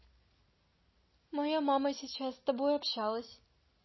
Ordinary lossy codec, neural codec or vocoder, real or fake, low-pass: MP3, 24 kbps; none; real; 7.2 kHz